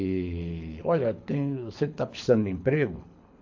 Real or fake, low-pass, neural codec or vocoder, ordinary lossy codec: fake; 7.2 kHz; codec, 24 kHz, 6 kbps, HILCodec; AAC, 48 kbps